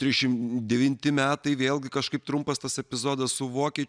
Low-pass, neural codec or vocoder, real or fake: 9.9 kHz; none; real